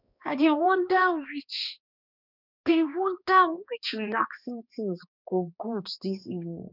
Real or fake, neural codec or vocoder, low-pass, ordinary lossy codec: fake; codec, 16 kHz, 2 kbps, X-Codec, HuBERT features, trained on balanced general audio; 5.4 kHz; none